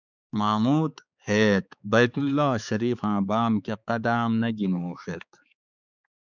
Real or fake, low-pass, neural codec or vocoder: fake; 7.2 kHz; codec, 16 kHz, 4 kbps, X-Codec, HuBERT features, trained on balanced general audio